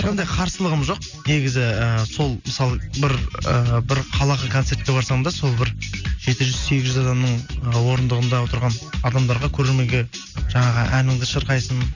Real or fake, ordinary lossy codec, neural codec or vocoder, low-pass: real; none; none; 7.2 kHz